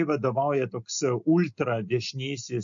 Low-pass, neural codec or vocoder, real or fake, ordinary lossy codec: 7.2 kHz; none; real; MP3, 48 kbps